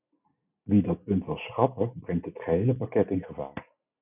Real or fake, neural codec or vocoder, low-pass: real; none; 3.6 kHz